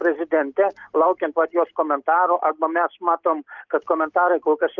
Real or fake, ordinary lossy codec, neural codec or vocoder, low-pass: real; Opus, 24 kbps; none; 7.2 kHz